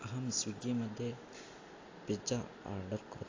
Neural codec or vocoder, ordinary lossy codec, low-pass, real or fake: none; AAC, 32 kbps; 7.2 kHz; real